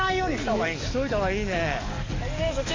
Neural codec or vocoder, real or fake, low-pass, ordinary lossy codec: codec, 44.1 kHz, 7.8 kbps, DAC; fake; 7.2 kHz; MP3, 48 kbps